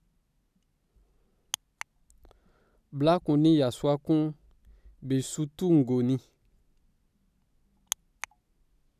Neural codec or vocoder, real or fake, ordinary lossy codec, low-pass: none; real; none; 14.4 kHz